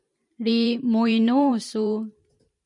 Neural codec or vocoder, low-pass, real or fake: vocoder, 44.1 kHz, 128 mel bands every 512 samples, BigVGAN v2; 10.8 kHz; fake